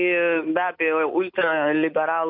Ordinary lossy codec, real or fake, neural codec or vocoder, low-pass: MP3, 48 kbps; real; none; 5.4 kHz